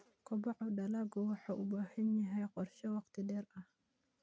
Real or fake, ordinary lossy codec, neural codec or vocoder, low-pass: real; none; none; none